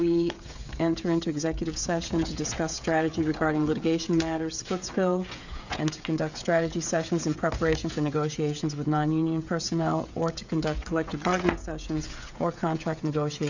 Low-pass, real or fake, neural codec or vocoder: 7.2 kHz; fake; codec, 16 kHz, 16 kbps, FreqCodec, smaller model